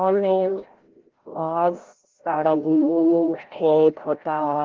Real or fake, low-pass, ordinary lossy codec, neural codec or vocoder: fake; 7.2 kHz; Opus, 16 kbps; codec, 16 kHz, 0.5 kbps, FreqCodec, larger model